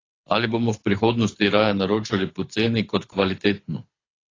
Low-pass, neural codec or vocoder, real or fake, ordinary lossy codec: 7.2 kHz; codec, 24 kHz, 6 kbps, HILCodec; fake; AAC, 32 kbps